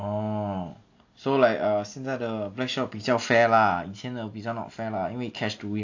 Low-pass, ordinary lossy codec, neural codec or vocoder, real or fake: 7.2 kHz; AAC, 48 kbps; none; real